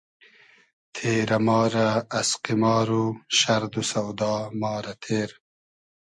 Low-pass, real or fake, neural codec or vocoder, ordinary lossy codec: 10.8 kHz; real; none; MP3, 48 kbps